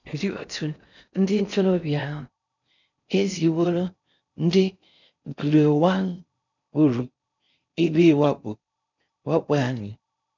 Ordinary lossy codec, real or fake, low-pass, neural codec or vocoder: none; fake; 7.2 kHz; codec, 16 kHz in and 24 kHz out, 0.6 kbps, FocalCodec, streaming, 4096 codes